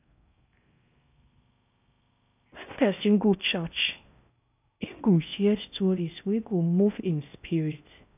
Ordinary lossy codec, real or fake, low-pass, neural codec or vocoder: none; fake; 3.6 kHz; codec, 16 kHz in and 24 kHz out, 0.6 kbps, FocalCodec, streaming, 4096 codes